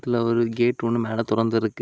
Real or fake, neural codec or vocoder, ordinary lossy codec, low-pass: real; none; none; none